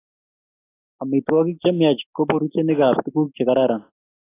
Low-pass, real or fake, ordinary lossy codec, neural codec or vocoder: 3.6 kHz; real; AAC, 24 kbps; none